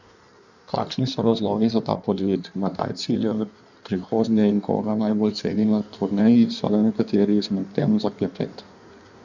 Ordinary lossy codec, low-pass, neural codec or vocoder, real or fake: none; 7.2 kHz; codec, 16 kHz in and 24 kHz out, 1.1 kbps, FireRedTTS-2 codec; fake